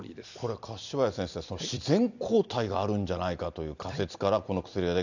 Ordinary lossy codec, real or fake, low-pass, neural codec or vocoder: none; real; 7.2 kHz; none